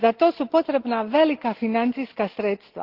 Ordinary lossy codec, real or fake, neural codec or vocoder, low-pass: Opus, 16 kbps; real; none; 5.4 kHz